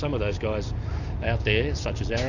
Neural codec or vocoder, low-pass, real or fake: none; 7.2 kHz; real